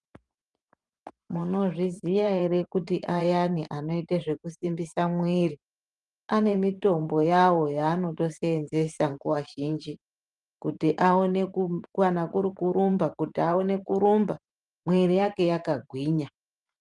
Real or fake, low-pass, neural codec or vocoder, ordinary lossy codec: fake; 10.8 kHz; vocoder, 44.1 kHz, 128 mel bands every 512 samples, BigVGAN v2; Opus, 32 kbps